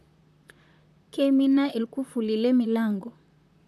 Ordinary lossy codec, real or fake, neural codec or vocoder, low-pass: none; fake; vocoder, 44.1 kHz, 128 mel bands, Pupu-Vocoder; 14.4 kHz